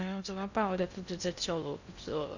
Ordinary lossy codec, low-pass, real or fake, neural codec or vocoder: none; 7.2 kHz; fake; codec, 16 kHz in and 24 kHz out, 0.6 kbps, FocalCodec, streaming, 2048 codes